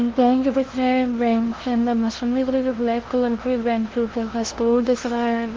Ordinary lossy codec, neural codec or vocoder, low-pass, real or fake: Opus, 16 kbps; codec, 16 kHz, 0.5 kbps, FunCodec, trained on LibriTTS, 25 frames a second; 7.2 kHz; fake